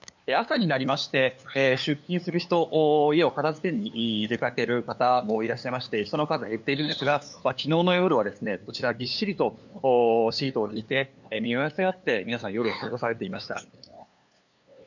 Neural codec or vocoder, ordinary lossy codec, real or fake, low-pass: codec, 16 kHz, 2 kbps, FunCodec, trained on LibriTTS, 25 frames a second; none; fake; 7.2 kHz